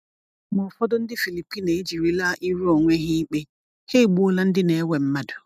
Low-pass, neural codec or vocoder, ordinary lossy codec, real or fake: 14.4 kHz; none; none; real